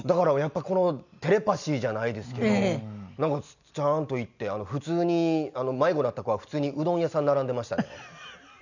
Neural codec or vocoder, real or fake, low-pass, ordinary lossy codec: none; real; 7.2 kHz; none